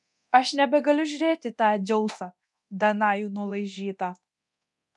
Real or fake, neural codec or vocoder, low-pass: fake; codec, 24 kHz, 0.9 kbps, DualCodec; 10.8 kHz